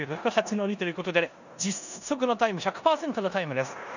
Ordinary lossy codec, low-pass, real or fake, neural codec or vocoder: none; 7.2 kHz; fake; codec, 16 kHz in and 24 kHz out, 0.9 kbps, LongCat-Audio-Codec, four codebook decoder